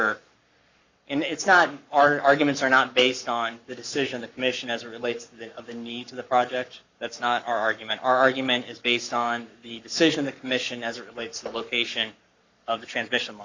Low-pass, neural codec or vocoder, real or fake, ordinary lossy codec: 7.2 kHz; codec, 44.1 kHz, 7.8 kbps, Pupu-Codec; fake; Opus, 64 kbps